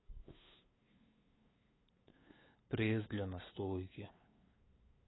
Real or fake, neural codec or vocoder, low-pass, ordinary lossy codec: fake; codec, 16 kHz, 8 kbps, FunCodec, trained on LibriTTS, 25 frames a second; 7.2 kHz; AAC, 16 kbps